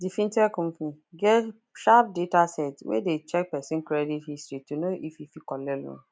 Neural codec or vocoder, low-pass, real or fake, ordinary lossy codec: none; none; real; none